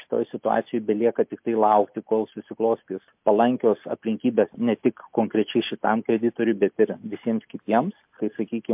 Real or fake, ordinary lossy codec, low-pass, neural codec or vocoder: real; MP3, 32 kbps; 3.6 kHz; none